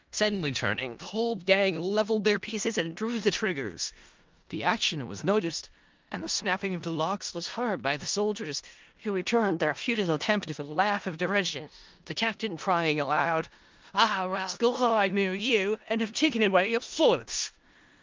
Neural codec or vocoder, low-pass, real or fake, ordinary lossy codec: codec, 16 kHz in and 24 kHz out, 0.4 kbps, LongCat-Audio-Codec, four codebook decoder; 7.2 kHz; fake; Opus, 24 kbps